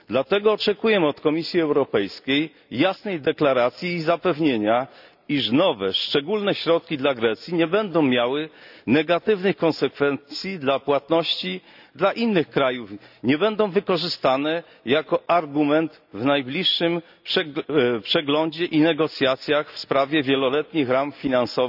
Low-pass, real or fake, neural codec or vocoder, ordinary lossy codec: 5.4 kHz; real; none; none